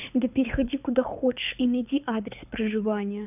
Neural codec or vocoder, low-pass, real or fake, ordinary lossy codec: codec, 24 kHz, 6 kbps, HILCodec; 3.6 kHz; fake; none